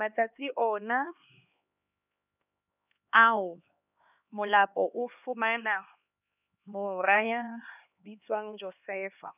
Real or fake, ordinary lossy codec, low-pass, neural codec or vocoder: fake; none; 3.6 kHz; codec, 16 kHz, 2 kbps, X-Codec, HuBERT features, trained on LibriSpeech